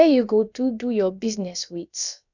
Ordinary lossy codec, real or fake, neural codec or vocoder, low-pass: none; fake; codec, 16 kHz, about 1 kbps, DyCAST, with the encoder's durations; 7.2 kHz